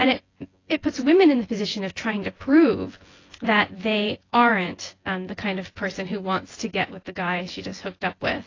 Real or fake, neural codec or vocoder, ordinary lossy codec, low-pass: fake; vocoder, 24 kHz, 100 mel bands, Vocos; AAC, 32 kbps; 7.2 kHz